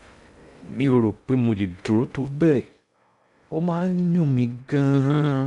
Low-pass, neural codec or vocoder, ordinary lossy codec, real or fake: 10.8 kHz; codec, 16 kHz in and 24 kHz out, 0.6 kbps, FocalCodec, streaming, 2048 codes; MP3, 96 kbps; fake